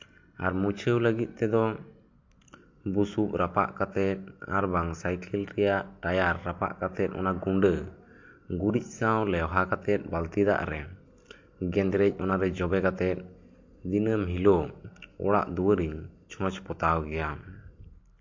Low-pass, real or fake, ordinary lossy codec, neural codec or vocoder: 7.2 kHz; real; MP3, 48 kbps; none